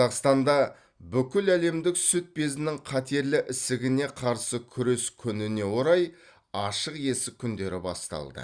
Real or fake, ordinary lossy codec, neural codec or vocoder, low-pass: real; Opus, 64 kbps; none; 9.9 kHz